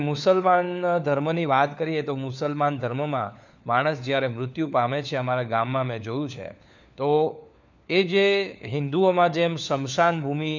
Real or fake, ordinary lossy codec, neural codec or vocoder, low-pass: fake; none; codec, 16 kHz, 4 kbps, FunCodec, trained on LibriTTS, 50 frames a second; 7.2 kHz